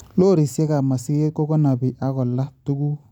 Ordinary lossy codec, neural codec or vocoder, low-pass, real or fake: none; none; 19.8 kHz; real